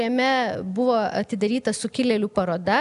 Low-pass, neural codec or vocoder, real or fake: 10.8 kHz; none; real